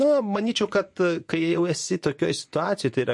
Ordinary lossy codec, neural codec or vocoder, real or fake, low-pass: MP3, 48 kbps; vocoder, 24 kHz, 100 mel bands, Vocos; fake; 10.8 kHz